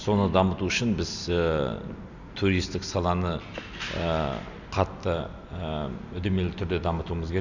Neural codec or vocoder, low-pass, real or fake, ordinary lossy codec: none; 7.2 kHz; real; none